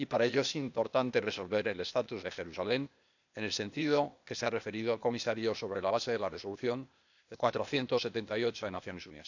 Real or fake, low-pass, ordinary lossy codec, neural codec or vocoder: fake; 7.2 kHz; none; codec, 16 kHz, 0.8 kbps, ZipCodec